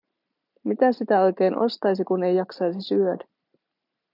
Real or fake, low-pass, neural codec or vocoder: real; 5.4 kHz; none